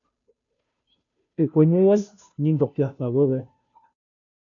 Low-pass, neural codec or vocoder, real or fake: 7.2 kHz; codec, 16 kHz, 0.5 kbps, FunCodec, trained on Chinese and English, 25 frames a second; fake